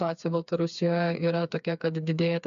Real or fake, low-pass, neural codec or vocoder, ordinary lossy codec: fake; 7.2 kHz; codec, 16 kHz, 4 kbps, FreqCodec, smaller model; MP3, 96 kbps